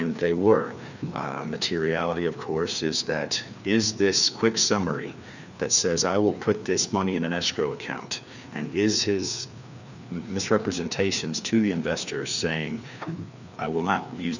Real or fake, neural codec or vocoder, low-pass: fake; codec, 16 kHz, 2 kbps, FreqCodec, larger model; 7.2 kHz